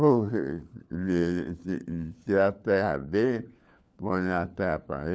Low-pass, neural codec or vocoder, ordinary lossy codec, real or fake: none; codec, 16 kHz, 4 kbps, FunCodec, trained on LibriTTS, 50 frames a second; none; fake